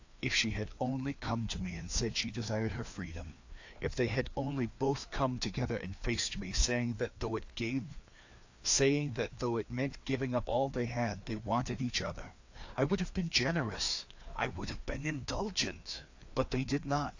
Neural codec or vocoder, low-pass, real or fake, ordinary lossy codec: codec, 16 kHz, 2 kbps, FreqCodec, larger model; 7.2 kHz; fake; AAC, 48 kbps